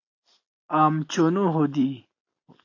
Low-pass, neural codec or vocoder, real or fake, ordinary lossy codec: 7.2 kHz; none; real; AAC, 48 kbps